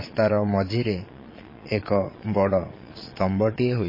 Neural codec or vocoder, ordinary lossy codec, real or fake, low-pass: vocoder, 22.05 kHz, 80 mel bands, Vocos; MP3, 24 kbps; fake; 5.4 kHz